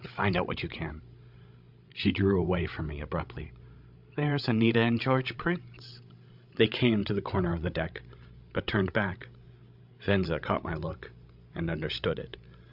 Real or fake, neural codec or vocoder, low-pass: fake; codec, 16 kHz, 16 kbps, FreqCodec, larger model; 5.4 kHz